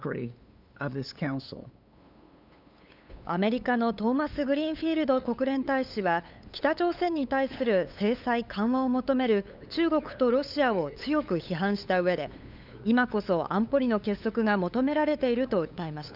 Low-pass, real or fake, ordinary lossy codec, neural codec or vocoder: 5.4 kHz; fake; none; codec, 16 kHz, 8 kbps, FunCodec, trained on LibriTTS, 25 frames a second